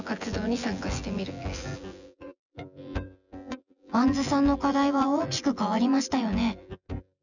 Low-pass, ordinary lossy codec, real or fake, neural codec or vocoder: 7.2 kHz; none; fake; vocoder, 24 kHz, 100 mel bands, Vocos